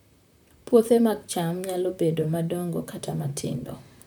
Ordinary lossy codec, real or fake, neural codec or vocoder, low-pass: none; fake; vocoder, 44.1 kHz, 128 mel bands, Pupu-Vocoder; none